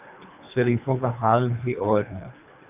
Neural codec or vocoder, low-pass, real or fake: codec, 24 kHz, 3 kbps, HILCodec; 3.6 kHz; fake